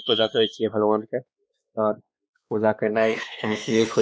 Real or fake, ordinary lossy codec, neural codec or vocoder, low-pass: fake; none; codec, 16 kHz, 2 kbps, X-Codec, WavLM features, trained on Multilingual LibriSpeech; none